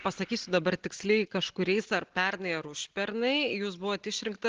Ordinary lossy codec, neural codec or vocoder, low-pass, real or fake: Opus, 16 kbps; none; 7.2 kHz; real